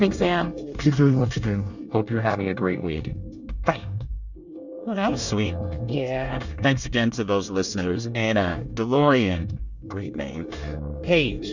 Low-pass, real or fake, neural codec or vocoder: 7.2 kHz; fake; codec, 24 kHz, 1 kbps, SNAC